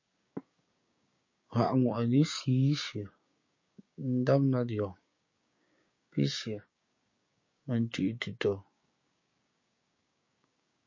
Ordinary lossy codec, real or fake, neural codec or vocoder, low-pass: MP3, 32 kbps; fake; codec, 16 kHz, 6 kbps, DAC; 7.2 kHz